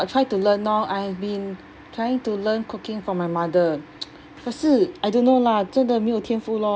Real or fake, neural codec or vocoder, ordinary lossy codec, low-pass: real; none; none; none